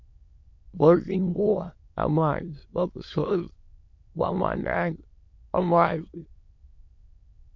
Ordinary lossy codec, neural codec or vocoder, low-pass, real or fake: MP3, 48 kbps; autoencoder, 22.05 kHz, a latent of 192 numbers a frame, VITS, trained on many speakers; 7.2 kHz; fake